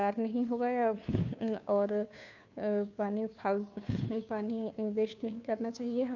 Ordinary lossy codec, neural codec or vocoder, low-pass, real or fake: none; codec, 16 kHz, 2 kbps, FunCodec, trained on Chinese and English, 25 frames a second; 7.2 kHz; fake